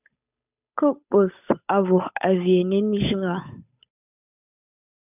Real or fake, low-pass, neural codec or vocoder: fake; 3.6 kHz; codec, 16 kHz, 8 kbps, FunCodec, trained on Chinese and English, 25 frames a second